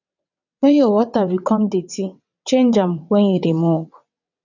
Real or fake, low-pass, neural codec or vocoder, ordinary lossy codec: fake; 7.2 kHz; vocoder, 22.05 kHz, 80 mel bands, WaveNeXt; none